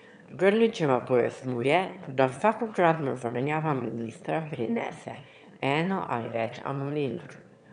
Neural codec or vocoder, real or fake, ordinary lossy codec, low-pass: autoencoder, 22.05 kHz, a latent of 192 numbers a frame, VITS, trained on one speaker; fake; none; 9.9 kHz